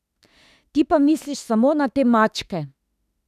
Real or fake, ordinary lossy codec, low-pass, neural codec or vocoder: fake; none; 14.4 kHz; autoencoder, 48 kHz, 32 numbers a frame, DAC-VAE, trained on Japanese speech